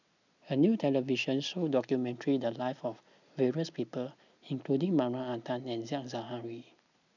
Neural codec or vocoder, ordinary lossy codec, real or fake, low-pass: none; none; real; 7.2 kHz